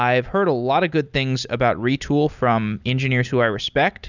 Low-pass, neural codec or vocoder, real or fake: 7.2 kHz; none; real